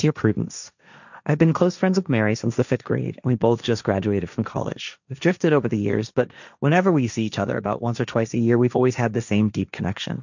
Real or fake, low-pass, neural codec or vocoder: fake; 7.2 kHz; codec, 16 kHz, 1.1 kbps, Voila-Tokenizer